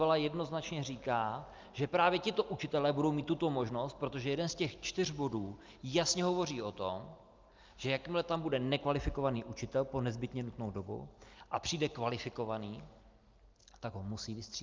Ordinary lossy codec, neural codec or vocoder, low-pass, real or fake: Opus, 24 kbps; none; 7.2 kHz; real